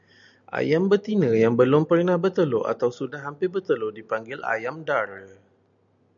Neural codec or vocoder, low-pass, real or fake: none; 7.2 kHz; real